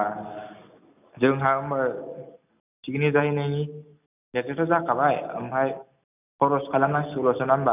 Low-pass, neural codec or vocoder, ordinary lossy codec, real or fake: 3.6 kHz; none; none; real